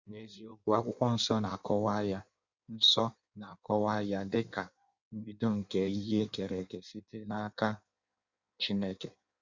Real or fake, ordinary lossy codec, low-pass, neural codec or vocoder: fake; Opus, 64 kbps; 7.2 kHz; codec, 16 kHz in and 24 kHz out, 1.1 kbps, FireRedTTS-2 codec